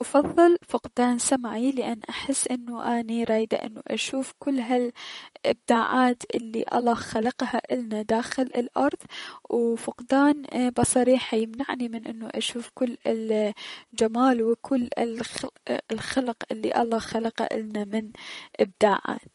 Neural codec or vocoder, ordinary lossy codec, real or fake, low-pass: vocoder, 44.1 kHz, 128 mel bands, Pupu-Vocoder; MP3, 48 kbps; fake; 19.8 kHz